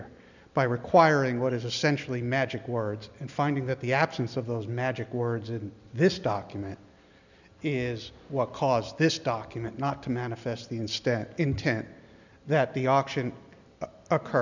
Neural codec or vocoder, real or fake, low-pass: none; real; 7.2 kHz